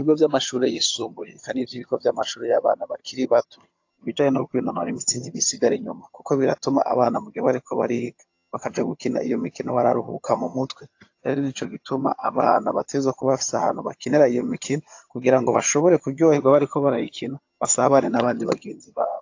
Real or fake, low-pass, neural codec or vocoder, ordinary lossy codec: fake; 7.2 kHz; vocoder, 22.05 kHz, 80 mel bands, HiFi-GAN; AAC, 48 kbps